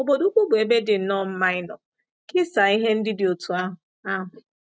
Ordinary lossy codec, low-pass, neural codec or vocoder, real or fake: none; none; none; real